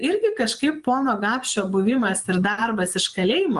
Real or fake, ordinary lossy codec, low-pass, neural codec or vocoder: fake; Opus, 24 kbps; 10.8 kHz; vocoder, 24 kHz, 100 mel bands, Vocos